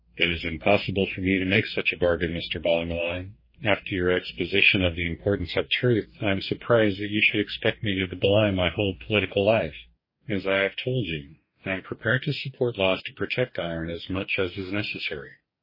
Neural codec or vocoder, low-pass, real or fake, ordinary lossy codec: codec, 44.1 kHz, 2.6 kbps, DAC; 5.4 kHz; fake; MP3, 24 kbps